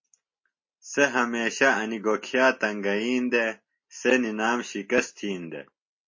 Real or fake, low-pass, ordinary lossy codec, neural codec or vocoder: real; 7.2 kHz; MP3, 32 kbps; none